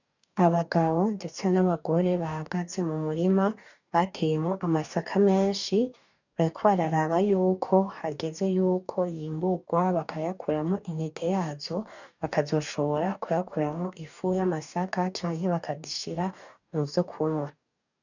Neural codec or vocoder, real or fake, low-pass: codec, 44.1 kHz, 2.6 kbps, DAC; fake; 7.2 kHz